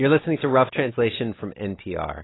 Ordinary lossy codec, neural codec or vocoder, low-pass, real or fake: AAC, 16 kbps; none; 7.2 kHz; real